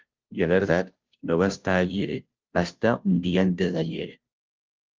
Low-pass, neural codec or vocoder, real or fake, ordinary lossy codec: 7.2 kHz; codec, 16 kHz, 0.5 kbps, FunCodec, trained on Chinese and English, 25 frames a second; fake; Opus, 32 kbps